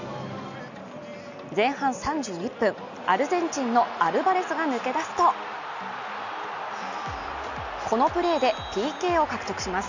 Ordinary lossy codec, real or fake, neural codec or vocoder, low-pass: none; real; none; 7.2 kHz